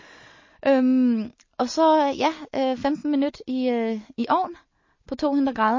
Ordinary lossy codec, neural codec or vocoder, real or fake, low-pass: MP3, 32 kbps; none; real; 7.2 kHz